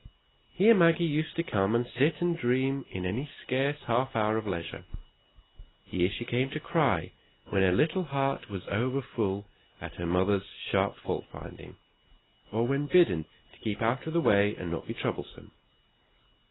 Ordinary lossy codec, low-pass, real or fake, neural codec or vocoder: AAC, 16 kbps; 7.2 kHz; real; none